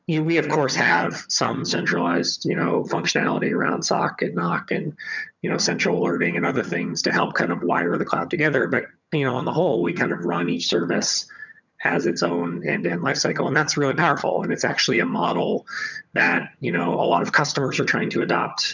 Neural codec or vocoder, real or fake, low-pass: vocoder, 22.05 kHz, 80 mel bands, HiFi-GAN; fake; 7.2 kHz